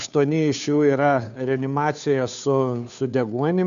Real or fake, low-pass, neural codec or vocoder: fake; 7.2 kHz; codec, 16 kHz, 2 kbps, FunCodec, trained on Chinese and English, 25 frames a second